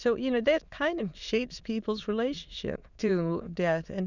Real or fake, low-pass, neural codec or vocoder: fake; 7.2 kHz; autoencoder, 22.05 kHz, a latent of 192 numbers a frame, VITS, trained on many speakers